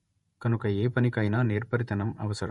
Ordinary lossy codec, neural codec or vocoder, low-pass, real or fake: MP3, 48 kbps; vocoder, 48 kHz, 128 mel bands, Vocos; 19.8 kHz; fake